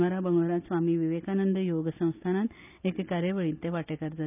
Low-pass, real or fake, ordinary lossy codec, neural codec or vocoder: 3.6 kHz; real; none; none